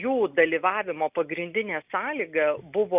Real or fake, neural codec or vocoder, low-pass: real; none; 3.6 kHz